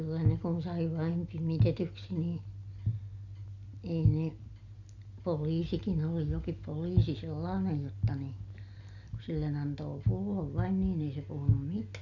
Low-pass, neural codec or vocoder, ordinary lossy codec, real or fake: 7.2 kHz; none; none; real